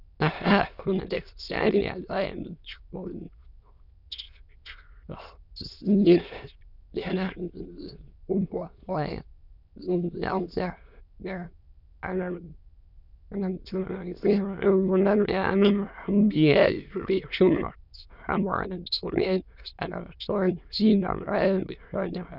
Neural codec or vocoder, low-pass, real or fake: autoencoder, 22.05 kHz, a latent of 192 numbers a frame, VITS, trained on many speakers; 5.4 kHz; fake